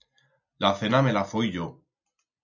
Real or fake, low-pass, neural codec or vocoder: real; 7.2 kHz; none